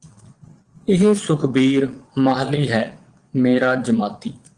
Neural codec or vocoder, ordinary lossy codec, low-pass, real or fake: vocoder, 22.05 kHz, 80 mel bands, Vocos; Opus, 24 kbps; 9.9 kHz; fake